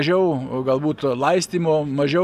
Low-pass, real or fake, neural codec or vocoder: 14.4 kHz; real; none